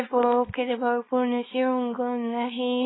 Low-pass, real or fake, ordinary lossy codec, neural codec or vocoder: 7.2 kHz; fake; AAC, 16 kbps; codec, 24 kHz, 0.9 kbps, WavTokenizer, small release